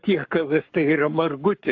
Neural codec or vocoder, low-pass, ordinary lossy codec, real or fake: codec, 44.1 kHz, 7.8 kbps, Pupu-Codec; 7.2 kHz; AAC, 48 kbps; fake